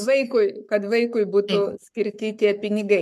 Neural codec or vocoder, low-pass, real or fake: codec, 44.1 kHz, 7.8 kbps, Pupu-Codec; 14.4 kHz; fake